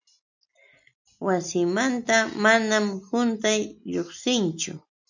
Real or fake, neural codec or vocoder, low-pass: real; none; 7.2 kHz